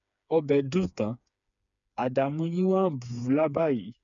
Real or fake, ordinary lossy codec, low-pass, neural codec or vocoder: fake; none; 7.2 kHz; codec, 16 kHz, 4 kbps, FreqCodec, smaller model